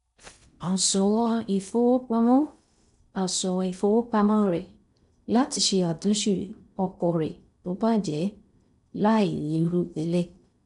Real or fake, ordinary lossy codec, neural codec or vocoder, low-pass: fake; none; codec, 16 kHz in and 24 kHz out, 0.6 kbps, FocalCodec, streaming, 4096 codes; 10.8 kHz